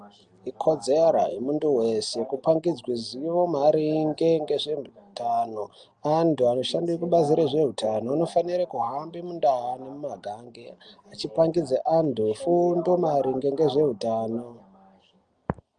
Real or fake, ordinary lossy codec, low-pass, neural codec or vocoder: real; Opus, 32 kbps; 9.9 kHz; none